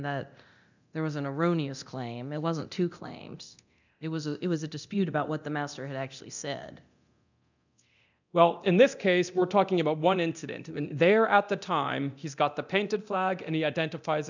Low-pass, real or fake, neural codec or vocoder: 7.2 kHz; fake; codec, 24 kHz, 0.9 kbps, DualCodec